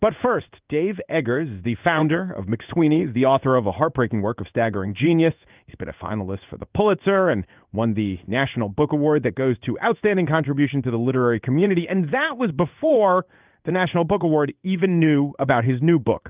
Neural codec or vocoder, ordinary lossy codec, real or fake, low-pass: codec, 16 kHz in and 24 kHz out, 1 kbps, XY-Tokenizer; Opus, 24 kbps; fake; 3.6 kHz